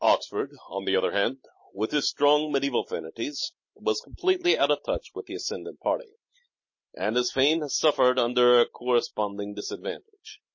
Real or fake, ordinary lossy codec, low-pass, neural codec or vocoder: real; MP3, 32 kbps; 7.2 kHz; none